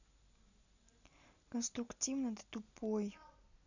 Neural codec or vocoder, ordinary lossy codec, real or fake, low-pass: none; none; real; 7.2 kHz